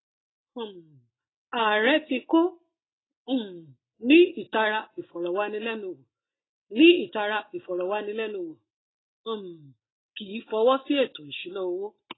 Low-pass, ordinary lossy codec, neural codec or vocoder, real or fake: 7.2 kHz; AAC, 16 kbps; none; real